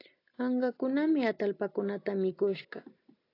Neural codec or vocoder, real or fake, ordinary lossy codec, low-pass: none; real; AAC, 32 kbps; 5.4 kHz